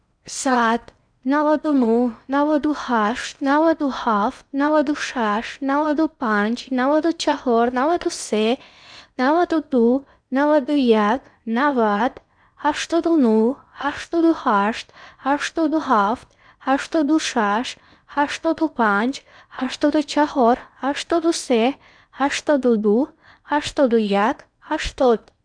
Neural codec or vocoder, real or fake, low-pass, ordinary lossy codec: codec, 16 kHz in and 24 kHz out, 0.8 kbps, FocalCodec, streaming, 65536 codes; fake; 9.9 kHz; none